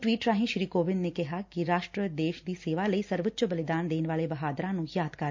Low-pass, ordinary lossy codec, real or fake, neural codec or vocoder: 7.2 kHz; MP3, 48 kbps; real; none